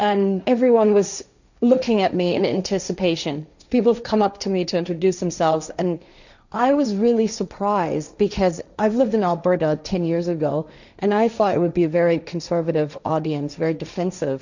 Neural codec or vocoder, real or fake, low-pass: codec, 16 kHz, 1.1 kbps, Voila-Tokenizer; fake; 7.2 kHz